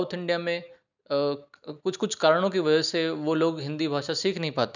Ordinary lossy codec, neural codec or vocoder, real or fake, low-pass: none; none; real; 7.2 kHz